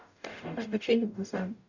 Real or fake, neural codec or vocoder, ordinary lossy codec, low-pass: fake; codec, 44.1 kHz, 0.9 kbps, DAC; none; 7.2 kHz